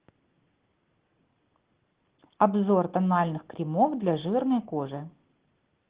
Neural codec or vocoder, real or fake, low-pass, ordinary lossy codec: none; real; 3.6 kHz; Opus, 16 kbps